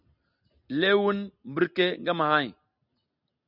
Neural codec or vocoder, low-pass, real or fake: none; 5.4 kHz; real